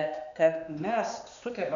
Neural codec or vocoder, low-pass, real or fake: codec, 16 kHz, 2 kbps, X-Codec, HuBERT features, trained on balanced general audio; 7.2 kHz; fake